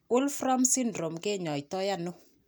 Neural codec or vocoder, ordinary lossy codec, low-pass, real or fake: none; none; none; real